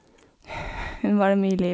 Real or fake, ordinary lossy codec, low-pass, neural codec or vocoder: real; none; none; none